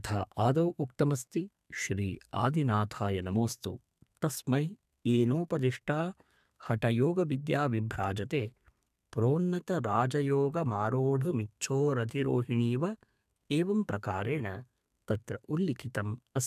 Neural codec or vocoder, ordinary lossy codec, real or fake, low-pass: codec, 32 kHz, 1.9 kbps, SNAC; none; fake; 14.4 kHz